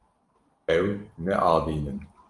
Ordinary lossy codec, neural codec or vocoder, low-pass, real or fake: Opus, 32 kbps; vocoder, 44.1 kHz, 128 mel bands every 512 samples, BigVGAN v2; 10.8 kHz; fake